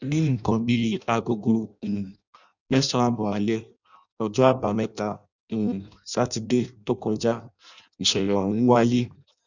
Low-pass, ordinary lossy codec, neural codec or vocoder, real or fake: 7.2 kHz; none; codec, 16 kHz in and 24 kHz out, 0.6 kbps, FireRedTTS-2 codec; fake